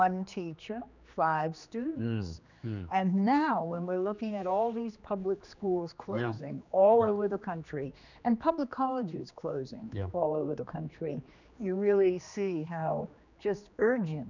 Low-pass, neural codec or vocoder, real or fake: 7.2 kHz; codec, 16 kHz, 2 kbps, X-Codec, HuBERT features, trained on general audio; fake